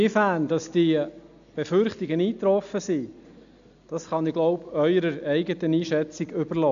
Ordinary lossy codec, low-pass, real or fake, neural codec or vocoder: none; 7.2 kHz; real; none